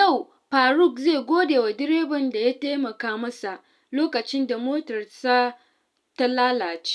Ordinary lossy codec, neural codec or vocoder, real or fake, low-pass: none; none; real; none